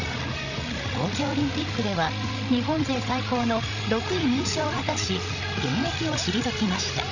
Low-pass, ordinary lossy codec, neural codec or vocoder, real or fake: 7.2 kHz; none; codec, 16 kHz, 16 kbps, FreqCodec, larger model; fake